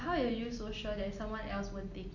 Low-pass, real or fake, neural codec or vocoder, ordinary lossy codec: 7.2 kHz; real; none; none